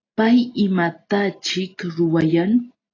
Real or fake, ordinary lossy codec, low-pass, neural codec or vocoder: real; AAC, 32 kbps; 7.2 kHz; none